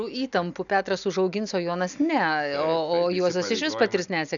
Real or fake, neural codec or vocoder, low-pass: real; none; 7.2 kHz